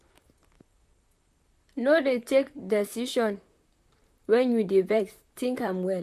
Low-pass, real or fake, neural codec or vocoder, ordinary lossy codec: 14.4 kHz; fake; vocoder, 44.1 kHz, 128 mel bands, Pupu-Vocoder; none